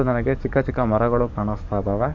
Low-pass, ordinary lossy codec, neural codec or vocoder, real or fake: 7.2 kHz; none; codec, 44.1 kHz, 7.8 kbps, DAC; fake